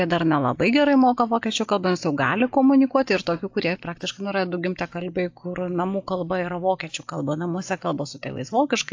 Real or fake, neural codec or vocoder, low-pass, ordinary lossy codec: real; none; 7.2 kHz; AAC, 48 kbps